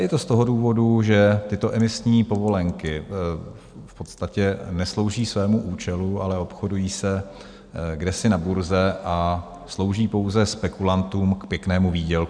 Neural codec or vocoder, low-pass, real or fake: none; 9.9 kHz; real